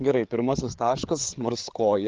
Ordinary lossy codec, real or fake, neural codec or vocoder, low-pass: Opus, 16 kbps; fake; codec, 16 kHz, 8 kbps, FreqCodec, larger model; 7.2 kHz